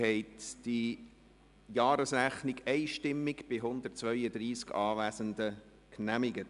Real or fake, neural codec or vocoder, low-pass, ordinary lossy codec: real; none; 10.8 kHz; MP3, 96 kbps